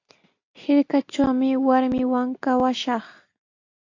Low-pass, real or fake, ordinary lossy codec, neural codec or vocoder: 7.2 kHz; real; AAC, 48 kbps; none